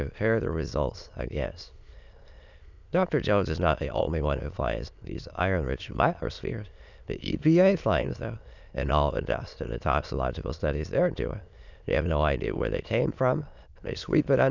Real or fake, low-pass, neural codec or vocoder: fake; 7.2 kHz; autoencoder, 22.05 kHz, a latent of 192 numbers a frame, VITS, trained on many speakers